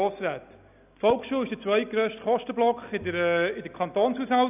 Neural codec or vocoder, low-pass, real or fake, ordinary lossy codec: none; 3.6 kHz; real; none